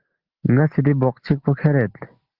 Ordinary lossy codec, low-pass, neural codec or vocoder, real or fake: Opus, 16 kbps; 5.4 kHz; none; real